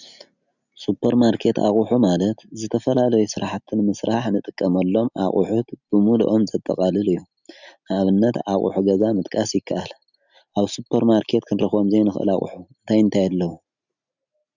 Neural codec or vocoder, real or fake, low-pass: none; real; 7.2 kHz